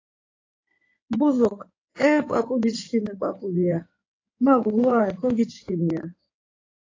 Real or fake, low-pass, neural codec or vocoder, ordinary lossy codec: fake; 7.2 kHz; codec, 16 kHz in and 24 kHz out, 2.2 kbps, FireRedTTS-2 codec; AAC, 32 kbps